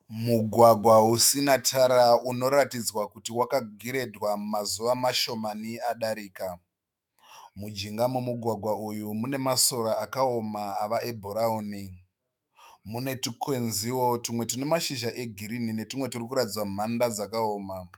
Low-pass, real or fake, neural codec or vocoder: 19.8 kHz; fake; autoencoder, 48 kHz, 128 numbers a frame, DAC-VAE, trained on Japanese speech